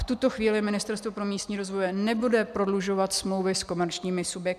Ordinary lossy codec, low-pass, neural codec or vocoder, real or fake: MP3, 96 kbps; 14.4 kHz; none; real